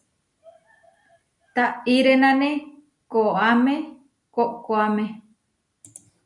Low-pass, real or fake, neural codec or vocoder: 10.8 kHz; real; none